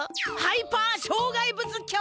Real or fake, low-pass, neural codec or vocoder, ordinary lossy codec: real; none; none; none